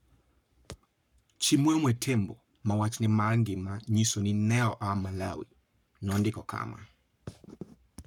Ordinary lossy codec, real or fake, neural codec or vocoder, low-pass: none; fake; codec, 44.1 kHz, 7.8 kbps, Pupu-Codec; 19.8 kHz